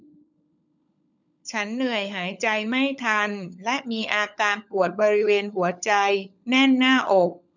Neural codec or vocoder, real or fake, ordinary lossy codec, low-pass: codec, 16 kHz, 16 kbps, FunCodec, trained on LibriTTS, 50 frames a second; fake; none; 7.2 kHz